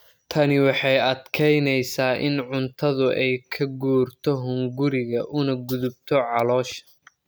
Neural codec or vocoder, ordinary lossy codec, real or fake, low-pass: none; none; real; none